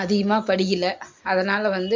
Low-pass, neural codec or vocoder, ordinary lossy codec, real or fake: 7.2 kHz; none; MP3, 48 kbps; real